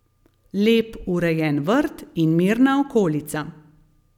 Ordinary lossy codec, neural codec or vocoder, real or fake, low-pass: none; none; real; 19.8 kHz